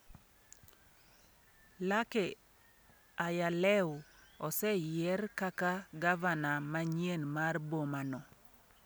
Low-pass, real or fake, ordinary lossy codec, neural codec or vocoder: none; real; none; none